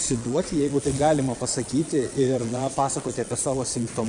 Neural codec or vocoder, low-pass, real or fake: vocoder, 22.05 kHz, 80 mel bands, Vocos; 9.9 kHz; fake